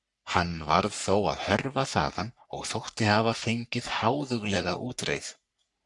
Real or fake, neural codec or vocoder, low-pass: fake; codec, 44.1 kHz, 3.4 kbps, Pupu-Codec; 10.8 kHz